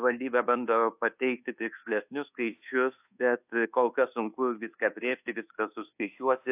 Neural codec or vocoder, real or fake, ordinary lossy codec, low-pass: codec, 24 kHz, 1.2 kbps, DualCodec; fake; AAC, 32 kbps; 3.6 kHz